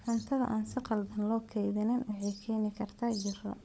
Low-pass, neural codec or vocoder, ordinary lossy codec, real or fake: none; codec, 16 kHz, 16 kbps, FunCodec, trained on LibriTTS, 50 frames a second; none; fake